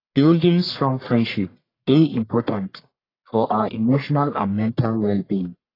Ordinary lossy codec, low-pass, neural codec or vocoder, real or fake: AAC, 24 kbps; 5.4 kHz; codec, 44.1 kHz, 1.7 kbps, Pupu-Codec; fake